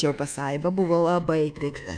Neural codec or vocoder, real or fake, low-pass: codec, 24 kHz, 1.2 kbps, DualCodec; fake; 9.9 kHz